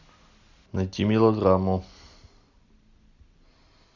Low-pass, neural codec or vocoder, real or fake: 7.2 kHz; none; real